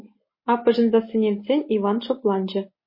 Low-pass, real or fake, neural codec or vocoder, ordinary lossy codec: 5.4 kHz; real; none; MP3, 24 kbps